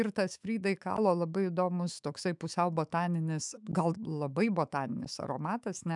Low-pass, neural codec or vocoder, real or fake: 10.8 kHz; autoencoder, 48 kHz, 128 numbers a frame, DAC-VAE, trained on Japanese speech; fake